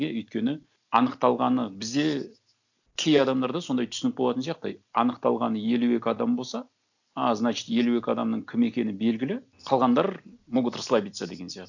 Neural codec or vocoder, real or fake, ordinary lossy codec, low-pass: none; real; none; 7.2 kHz